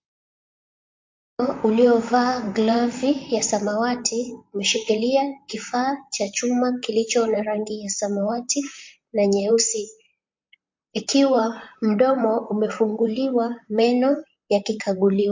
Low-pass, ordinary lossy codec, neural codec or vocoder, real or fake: 7.2 kHz; MP3, 48 kbps; vocoder, 44.1 kHz, 128 mel bands, Pupu-Vocoder; fake